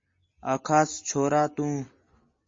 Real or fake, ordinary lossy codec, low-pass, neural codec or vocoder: real; MP3, 32 kbps; 7.2 kHz; none